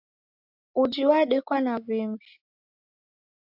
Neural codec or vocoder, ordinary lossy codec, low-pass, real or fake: none; AAC, 48 kbps; 5.4 kHz; real